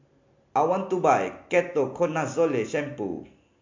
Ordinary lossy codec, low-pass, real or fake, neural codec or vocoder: MP3, 48 kbps; 7.2 kHz; real; none